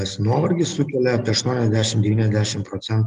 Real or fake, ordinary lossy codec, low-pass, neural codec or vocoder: real; Opus, 32 kbps; 10.8 kHz; none